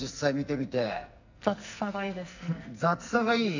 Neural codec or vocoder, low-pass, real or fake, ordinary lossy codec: codec, 44.1 kHz, 2.6 kbps, SNAC; 7.2 kHz; fake; none